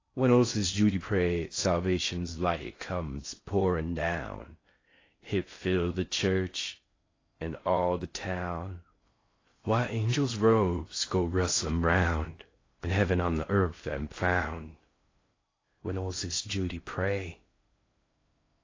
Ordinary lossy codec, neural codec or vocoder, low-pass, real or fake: AAC, 32 kbps; codec, 16 kHz in and 24 kHz out, 0.6 kbps, FocalCodec, streaming, 4096 codes; 7.2 kHz; fake